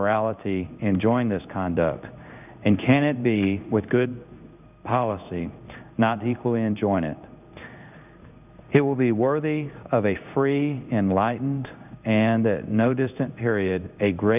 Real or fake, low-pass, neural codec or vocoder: fake; 3.6 kHz; codec, 16 kHz in and 24 kHz out, 1 kbps, XY-Tokenizer